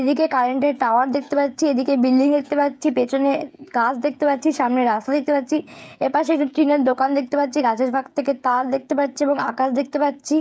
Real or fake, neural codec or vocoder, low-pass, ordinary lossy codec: fake; codec, 16 kHz, 16 kbps, FreqCodec, smaller model; none; none